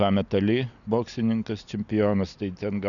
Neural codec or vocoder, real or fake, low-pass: none; real; 7.2 kHz